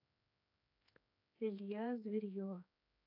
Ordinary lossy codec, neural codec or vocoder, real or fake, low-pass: AAC, 32 kbps; codec, 16 kHz, 4 kbps, X-Codec, HuBERT features, trained on general audio; fake; 5.4 kHz